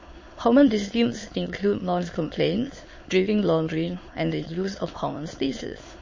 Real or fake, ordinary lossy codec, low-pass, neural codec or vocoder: fake; MP3, 32 kbps; 7.2 kHz; autoencoder, 22.05 kHz, a latent of 192 numbers a frame, VITS, trained on many speakers